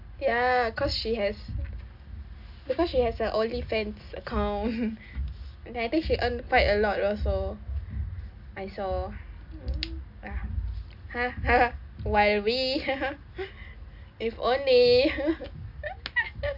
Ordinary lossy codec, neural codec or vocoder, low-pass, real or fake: AAC, 48 kbps; none; 5.4 kHz; real